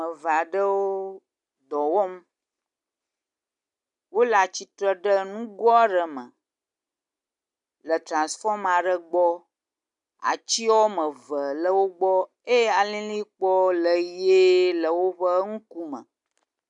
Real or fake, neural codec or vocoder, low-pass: real; none; 10.8 kHz